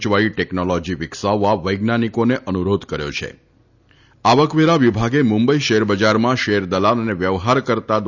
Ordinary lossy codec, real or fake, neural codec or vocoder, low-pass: none; real; none; 7.2 kHz